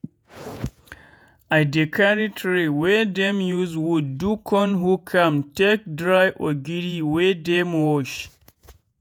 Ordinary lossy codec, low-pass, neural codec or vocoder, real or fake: none; none; vocoder, 48 kHz, 128 mel bands, Vocos; fake